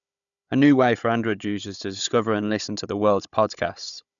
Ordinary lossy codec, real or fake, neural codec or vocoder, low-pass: none; fake; codec, 16 kHz, 16 kbps, FunCodec, trained on Chinese and English, 50 frames a second; 7.2 kHz